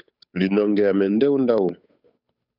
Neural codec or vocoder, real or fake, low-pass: codec, 16 kHz, 8 kbps, FunCodec, trained on Chinese and English, 25 frames a second; fake; 5.4 kHz